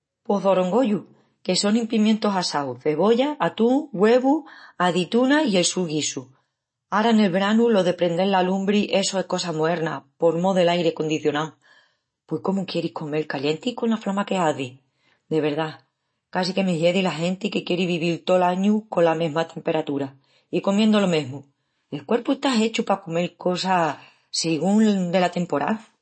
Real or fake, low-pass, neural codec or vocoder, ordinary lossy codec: real; 9.9 kHz; none; MP3, 32 kbps